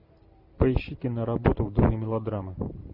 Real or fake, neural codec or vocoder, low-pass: real; none; 5.4 kHz